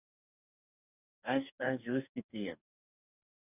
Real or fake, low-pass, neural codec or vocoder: fake; 3.6 kHz; codec, 44.1 kHz, 2.6 kbps, DAC